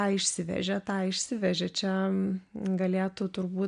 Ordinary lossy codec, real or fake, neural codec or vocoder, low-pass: MP3, 64 kbps; real; none; 9.9 kHz